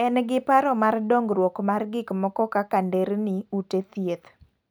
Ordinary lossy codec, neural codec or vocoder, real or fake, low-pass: none; none; real; none